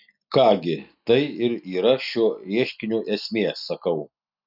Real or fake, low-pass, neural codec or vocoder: real; 5.4 kHz; none